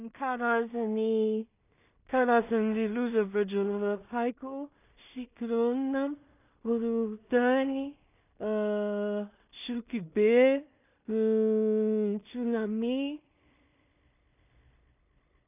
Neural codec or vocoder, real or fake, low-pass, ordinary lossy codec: codec, 16 kHz in and 24 kHz out, 0.4 kbps, LongCat-Audio-Codec, two codebook decoder; fake; 3.6 kHz; none